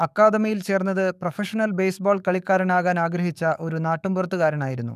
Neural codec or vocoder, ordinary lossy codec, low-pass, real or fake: codec, 44.1 kHz, 7.8 kbps, DAC; none; 14.4 kHz; fake